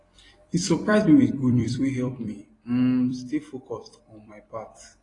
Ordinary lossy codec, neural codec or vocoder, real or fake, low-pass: AAC, 32 kbps; vocoder, 44.1 kHz, 128 mel bands every 256 samples, BigVGAN v2; fake; 10.8 kHz